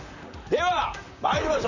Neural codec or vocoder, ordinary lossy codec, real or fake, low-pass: vocoder, 44.1 kHz, 128 mel bands, Pupu-Vocoder; none; fake; 7.2 kHz